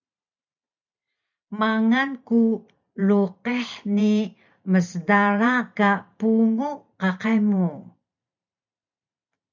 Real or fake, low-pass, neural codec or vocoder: fake; 7.2 kHz; vocoder, 44.1 kHz, 128 mel bands every 512 samples, BigVGAN v2